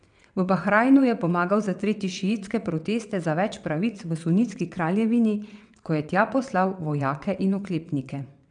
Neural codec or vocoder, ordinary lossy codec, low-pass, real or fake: vocoder, 22.05 kHz, 80 mel bands, WaveNeXt; none; 9.9 kHz; fake